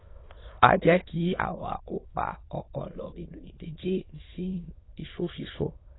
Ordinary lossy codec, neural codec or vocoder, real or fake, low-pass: AAC, 16 kbps; autoencoder, 22.05 kHz, a latent of 192 numbers a frame, VITS, trained on many speakers; fake; 7.2 kHz